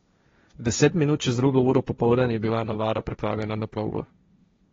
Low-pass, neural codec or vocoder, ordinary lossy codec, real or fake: 7.2 kHz; codec, 16 kHz, 1.1 kbps, Voila-Tokenizer; AAC, 24 kbps; fake